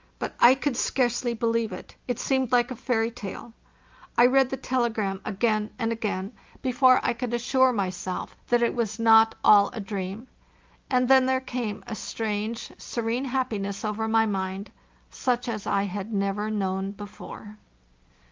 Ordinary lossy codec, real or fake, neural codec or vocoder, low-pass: Opus, 32 kbps; real; none; 7.2 kHz